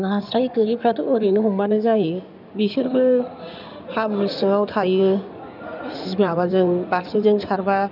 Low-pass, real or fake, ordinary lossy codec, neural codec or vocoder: 5.4 kHz; fake; none; codec, 16 kHz in and 24 kHz out, 2.2 kbps, FireRedTTS-2 codec